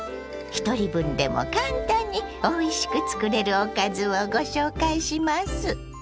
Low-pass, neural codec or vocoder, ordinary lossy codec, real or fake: none; none; none; real